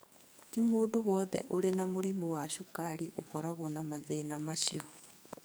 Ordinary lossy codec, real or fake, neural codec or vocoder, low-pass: none; fake; codec, 44.1 kHz, 2.6 kbps, SNAC; none